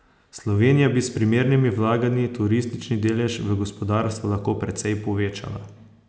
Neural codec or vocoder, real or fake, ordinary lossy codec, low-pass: none; real; none; none